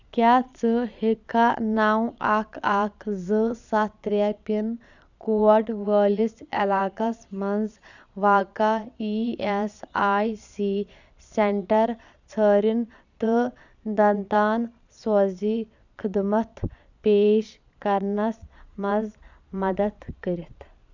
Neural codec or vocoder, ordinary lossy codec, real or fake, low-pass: vocoder, 22.05 kHz, 80 mel bands, Vocos; none; fake; 7.2 kHz